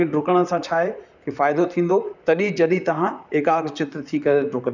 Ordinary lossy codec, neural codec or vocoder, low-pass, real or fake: none; vocoder, 44.1 kHz, 128 mel bands, Pupu-Vocoder; 7.2 kHz; fake